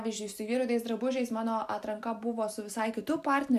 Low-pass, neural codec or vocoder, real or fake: 14.4 kHz; none; real